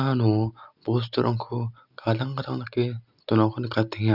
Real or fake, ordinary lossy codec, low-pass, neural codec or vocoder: real; none; 5.4 kHz; none